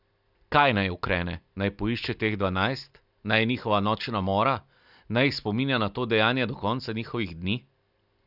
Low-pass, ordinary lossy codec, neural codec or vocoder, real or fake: 5.4 kHz; none; none; real